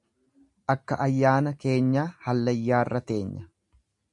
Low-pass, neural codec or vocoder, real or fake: 10.8 kHz; none; real